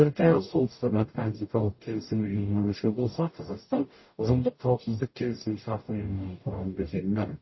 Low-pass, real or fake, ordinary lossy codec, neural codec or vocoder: 7.2 kHz; fake; MP3, 24 kbps; codec, 44.1 kHz, 0.9 kbps, DAC